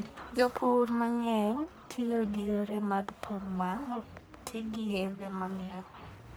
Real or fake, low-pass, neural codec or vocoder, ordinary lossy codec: fake; none; codec, 44.1 kHz, 1.7 kbps, Pupu-Codec; none